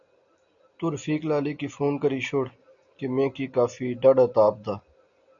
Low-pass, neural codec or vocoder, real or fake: 7.2 kHz; none; real